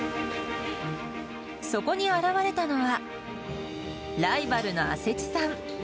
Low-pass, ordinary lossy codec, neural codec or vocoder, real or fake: none; none; none; real